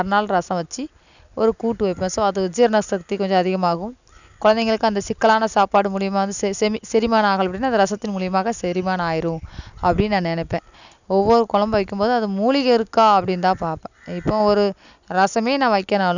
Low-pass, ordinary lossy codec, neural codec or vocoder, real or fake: 7.2 kHz; none; none; real